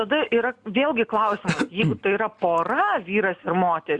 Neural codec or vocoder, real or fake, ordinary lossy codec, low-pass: none; real; Opus, 64 kbps; 10.8 kHz